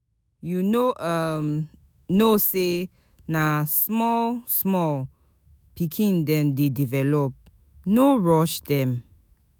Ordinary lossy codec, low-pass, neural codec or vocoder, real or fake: none; none; autoencoder, 48 kHz, 128 numbers a frame, DAC-VAE, trained on Japanese speech; fake